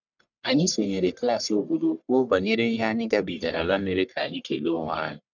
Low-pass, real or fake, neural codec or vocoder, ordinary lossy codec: 7.2 kHz; fake; codec, 44.1 kHz, 1.7 kbps, Pupu-Codec; none